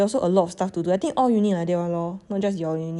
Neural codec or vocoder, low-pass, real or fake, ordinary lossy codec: none; 10.8 kHz; real; none